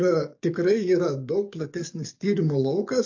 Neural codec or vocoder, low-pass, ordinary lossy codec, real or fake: codec, 44.1 kHz, 7.8 kbps, DAC; 7.2 kHz; AAC, 48 kbps; fake